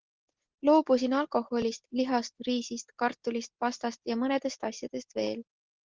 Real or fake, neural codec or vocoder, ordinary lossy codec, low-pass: real; none; Opus, 16 kbps; 7.2 kHz